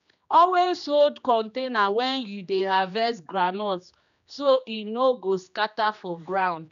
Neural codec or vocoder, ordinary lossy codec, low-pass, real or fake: codec, 16 kHz, 2 kbps, X-Codec, HuBERT features, trained on general audio; none; 7.2 kHz; fake